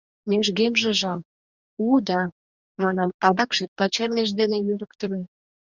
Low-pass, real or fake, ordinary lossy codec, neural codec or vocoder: 7.2 kHz; fake; Opus, 64 kbps; codec, 44.1 kHz, 2.6 kbps, DAC